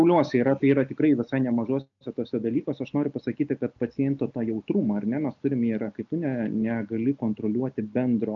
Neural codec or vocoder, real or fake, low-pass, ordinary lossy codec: none; real; 7.2 kHz; MP3, 64 kbps